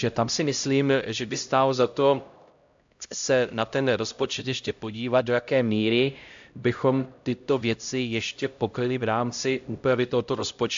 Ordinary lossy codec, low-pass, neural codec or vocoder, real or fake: AAC, 64 kbps; 7.2 kHz; codec, 16 kHz, 0.5 kbps, X-Codec, HuBERT features, trained on LibriSpeech; fake